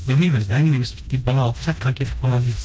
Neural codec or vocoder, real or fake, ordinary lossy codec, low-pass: codec, 16 kHz, 1 kbps, FreqCodec, smaller model; fake; none; none